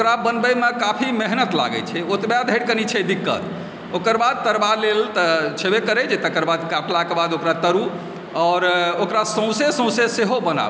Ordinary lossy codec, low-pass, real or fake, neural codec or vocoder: none; none; real; none